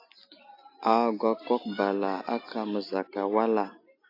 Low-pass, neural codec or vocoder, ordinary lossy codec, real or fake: 5.4 kHz; none; AAC, 32 kbps; real